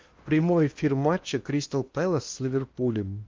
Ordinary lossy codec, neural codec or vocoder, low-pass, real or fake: Opus, 24 kbps; codec, 16 kHz in and 24 kHz out, 0.8 kbps, FocalCodec, streaming, 65536 codes; 7.2 kHz; fake